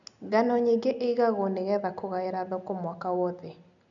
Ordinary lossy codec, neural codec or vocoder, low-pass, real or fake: none; none; 7.2 kHz; real